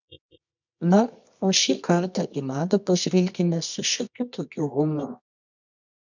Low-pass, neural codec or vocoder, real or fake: 7.2 kHz; codec, 24 kHz, 0.9 kbps, WavTokenizer, medium music audio release; fake